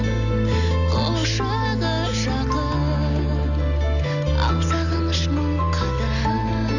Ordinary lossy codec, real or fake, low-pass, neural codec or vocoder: none; real; 7.2 kHz; none